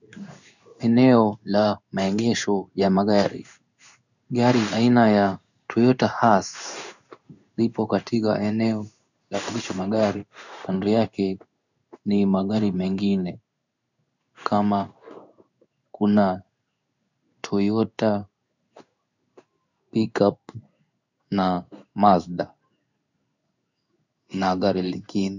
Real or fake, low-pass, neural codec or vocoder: fake; 7.2 kHz; codec, 16 kHz in and 24 kHz out, 1 kbps, XY-Tokenizer